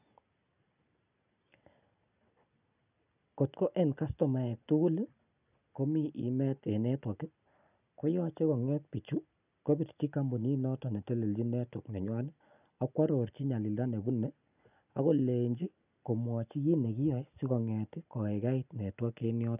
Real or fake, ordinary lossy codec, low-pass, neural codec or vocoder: real; none; 3.6 kHz; none